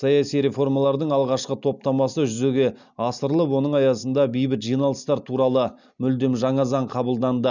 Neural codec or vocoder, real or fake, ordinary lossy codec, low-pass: none; real; none; 7.2 kHz